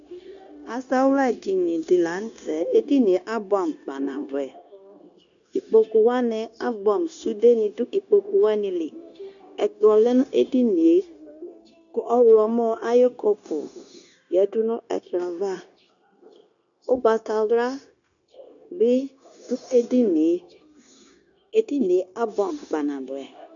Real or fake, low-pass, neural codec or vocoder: fake; 7.2 kHz; codec, 16 kHz, 0.9 kbps, LongCat-Audio-Codec